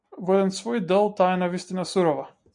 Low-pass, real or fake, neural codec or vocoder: 10.8 kHz; real; none